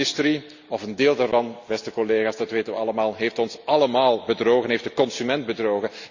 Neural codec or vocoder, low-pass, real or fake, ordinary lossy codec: none; 7.2 kHz; real; Opus, 64 kbps